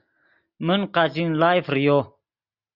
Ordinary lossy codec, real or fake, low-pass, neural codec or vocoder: Opus, 64 kbps; real; 5.4 kHz; none